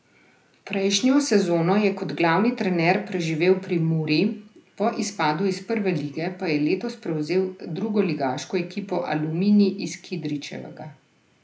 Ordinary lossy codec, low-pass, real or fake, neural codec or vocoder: none; none; real; none